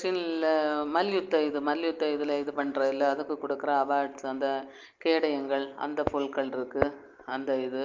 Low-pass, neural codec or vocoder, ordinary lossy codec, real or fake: 7.2 kHz; none; Opus, 24 kbps; real